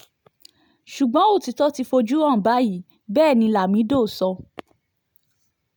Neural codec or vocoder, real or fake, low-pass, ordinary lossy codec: none; real; none; none